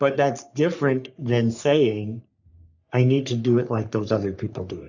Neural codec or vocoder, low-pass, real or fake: codec, 44.1 kHz, 3.4 kbps, Pupu-Codec; 7.2 kHz; fake